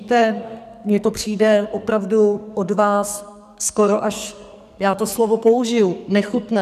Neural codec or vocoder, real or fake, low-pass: codec, 44.1 kHz, 2.6 kbps, SNAC; fake; 14.4 kHz